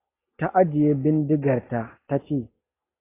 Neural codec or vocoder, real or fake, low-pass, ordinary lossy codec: none; real; 3.6 kHz; AAC, 16 kbps